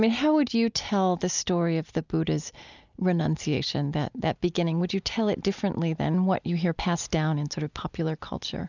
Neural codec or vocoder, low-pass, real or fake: none; 7.2 kHz; real